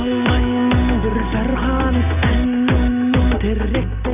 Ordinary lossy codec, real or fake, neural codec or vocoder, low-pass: none; real; none; 3.6 kHz